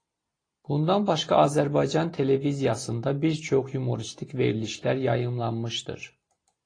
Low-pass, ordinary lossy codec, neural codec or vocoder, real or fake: 9.9 kHz; AAC, 32 kbps; none; real